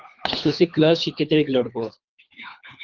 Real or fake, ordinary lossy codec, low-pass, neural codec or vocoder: fake; Opus, 24 kbps; 7.2 kHz; codec, 24 kHz, 3 kbps, HILCodec